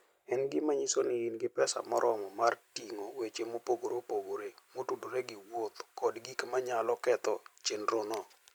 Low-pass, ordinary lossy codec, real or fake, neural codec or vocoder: none; none; fake; vocoder, 44.1 kHz, 128 mel bands every 256 samples, BigVGAN v2